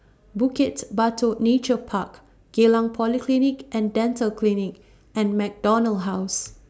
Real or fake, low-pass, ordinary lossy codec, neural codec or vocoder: real; none; none; none